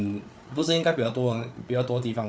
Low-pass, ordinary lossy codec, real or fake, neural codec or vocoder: none; none; fake; codec, 16 kHz, 8 kbps, FreqCodec, larger model